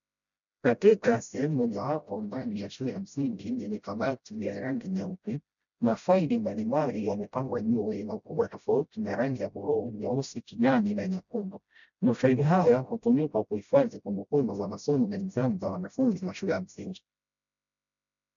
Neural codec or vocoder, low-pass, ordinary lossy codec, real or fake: codec, 16 kHz, 0.5 kbps, FreqCodec, smaller model; 7.2 kHz; MP3, 96 kbps; fake